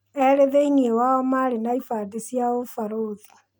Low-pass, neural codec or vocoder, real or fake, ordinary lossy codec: none; none; real; none